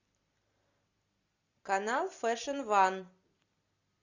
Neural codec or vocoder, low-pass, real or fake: none; 7.2 kHz; real